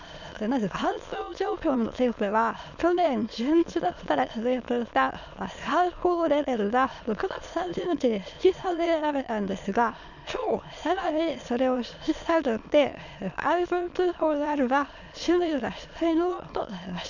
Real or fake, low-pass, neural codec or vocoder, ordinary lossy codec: fake; 7.2 kHz; autoencoder, 22.05 kHz, a latent of 192 numbers a frame, VITS, trained on many speakers; none